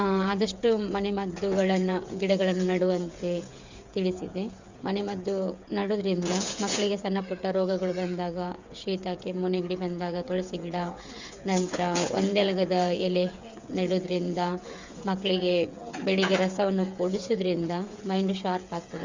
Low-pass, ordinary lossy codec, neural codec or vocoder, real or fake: 7.2 kHz; Opus, 64 kbps; codec, 16 kHz, 8 kbps, FreqCodec, smaller model; fake